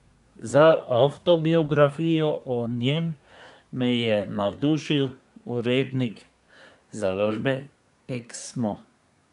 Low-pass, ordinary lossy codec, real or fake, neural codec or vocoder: 10.8 kHz; none; fake; codec, 24 kHz, 1 kbps, SNAC